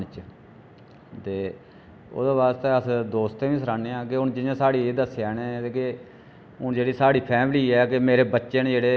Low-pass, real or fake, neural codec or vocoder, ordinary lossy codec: none; real; none; none